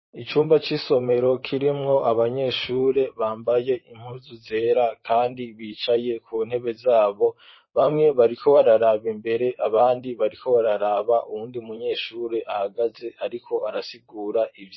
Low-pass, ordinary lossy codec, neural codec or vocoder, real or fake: 7.2 kHz; MP3, 24 kbps; vocoder, 44.1 kHz, 128 mel bands, Pupu-Vocoder; fake